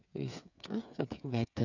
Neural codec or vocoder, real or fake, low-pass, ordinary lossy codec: codec, 16 kHz, 8 kbps, FreqCodec, smaller model; fake; 7.2 kHz; none